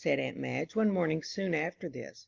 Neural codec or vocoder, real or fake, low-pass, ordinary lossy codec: none; real; 7.2 kHz; Opus, 32 kbps